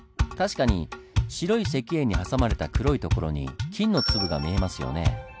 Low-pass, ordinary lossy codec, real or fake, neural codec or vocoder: none; none; real; none